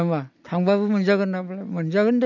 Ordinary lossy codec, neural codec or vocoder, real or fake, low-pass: none; none; real; 7.2 kHz